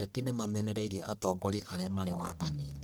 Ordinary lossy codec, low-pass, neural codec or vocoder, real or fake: none; none; codec, 44.1 kHz, 1.7 kbps, Pupu-Codec; fake